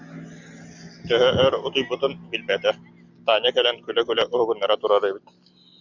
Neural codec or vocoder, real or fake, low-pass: none; real; 7.2 kHz